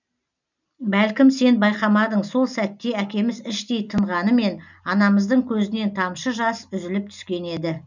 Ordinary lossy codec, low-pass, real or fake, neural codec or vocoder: none; 7.2 kHz; real; none